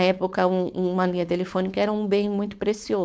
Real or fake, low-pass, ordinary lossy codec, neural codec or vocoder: fake; none; none; codec, 16 kHz, 4.8 kbps, FACodec